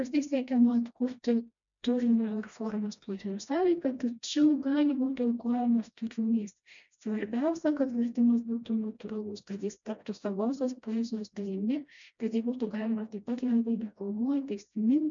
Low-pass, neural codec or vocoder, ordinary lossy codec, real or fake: 7.2 kHz; codec, 16 kHz, 1 kbps, FreqCodec, smaller model; MP3, 64 kbps; fake